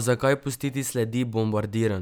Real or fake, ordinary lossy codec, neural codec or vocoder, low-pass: real; none; none; none